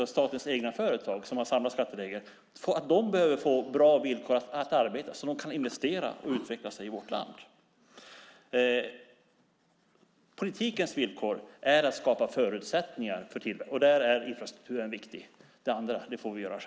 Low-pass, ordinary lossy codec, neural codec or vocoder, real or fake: none; none; none; real